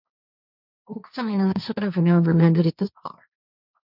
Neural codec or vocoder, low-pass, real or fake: codec, 16 kHz, 1.1 kbps, Voila-Tokenizer; 5.4 kHz; fake